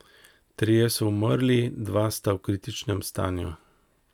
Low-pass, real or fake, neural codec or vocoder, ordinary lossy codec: 19.8 kHz; real; none; none